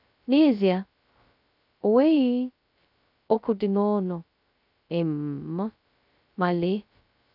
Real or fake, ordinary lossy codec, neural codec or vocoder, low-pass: fake; none; codec, 16 kHz, 0.2 kbps, FocalCodec; 5.4 kHz